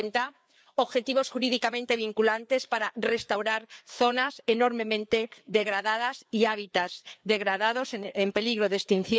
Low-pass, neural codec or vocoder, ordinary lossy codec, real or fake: none; codec, 16 kHz, 4 kbps, FreqCodec, larger model; none; fake